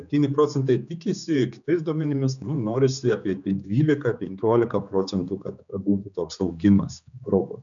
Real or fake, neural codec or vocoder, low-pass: fake; codec, 16 kHz, 4 kbps, X-Codec, HuBERT features, trained on general audio; 7.2 kHz